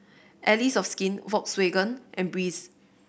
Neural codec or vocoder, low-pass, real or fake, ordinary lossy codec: none; none; real; none